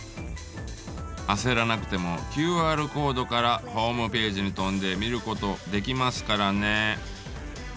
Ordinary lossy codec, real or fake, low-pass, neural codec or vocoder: none; real; none; none